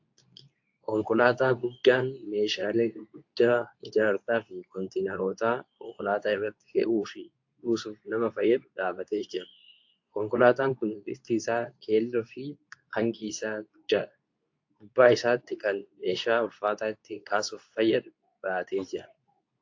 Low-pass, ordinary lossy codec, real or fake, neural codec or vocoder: 7.2 kHz; AAC, 48 kbps; fake; codec, 24 kHz, 0.9 kbps, WavTokenizer, medium speech release version 2